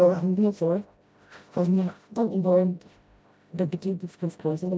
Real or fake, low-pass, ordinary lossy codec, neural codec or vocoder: fake; none; none; codec, 16 kHz, 0.5 kbps, FreqCodec, smaller model